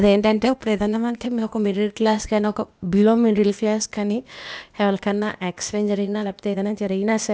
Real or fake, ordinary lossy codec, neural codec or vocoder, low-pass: fake; none; codec, 16 kHz, 0.8 kbps, ZipCodec; none